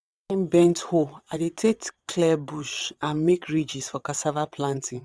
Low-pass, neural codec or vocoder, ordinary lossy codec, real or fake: none; vocoder, 22.05 kHz, 80 mel bands, WaveNeXt; none; fake